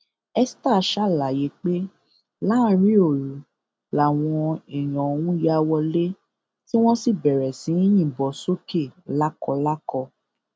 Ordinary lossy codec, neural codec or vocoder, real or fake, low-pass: none; none; real; none